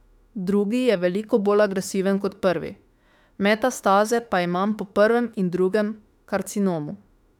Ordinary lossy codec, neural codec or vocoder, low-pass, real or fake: none; autoencoder, 48 kHz, 32 numbers a frame, DAC-VAE, trained on Japanese speech; 19.8 kHz; fake